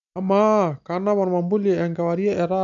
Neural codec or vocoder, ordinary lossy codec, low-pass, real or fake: none; none; 7.2 kHz; real